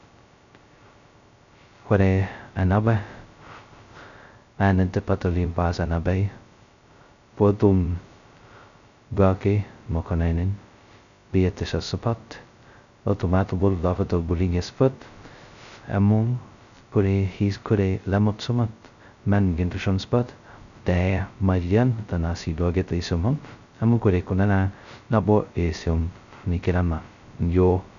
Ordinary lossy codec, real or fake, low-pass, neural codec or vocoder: none; fake; 7.2 kHz; codec, 16 kHz, 0.2 kbps, FocalCodec